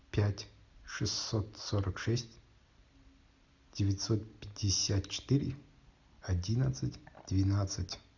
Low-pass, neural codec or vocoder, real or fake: 7.2 kHz; none; real